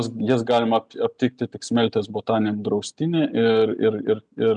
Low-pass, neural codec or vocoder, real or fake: 9.9 kHz; none; real